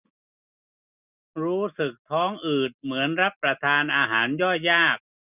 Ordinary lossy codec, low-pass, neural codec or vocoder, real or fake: none; 3.6 kHz; none; real